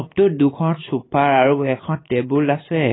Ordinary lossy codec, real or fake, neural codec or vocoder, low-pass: AAC, 16 kbps; fake; vocoder, 44.1 kHz, 128 mel bands every 512 samples, BigVGAN v2; 7.2 kHz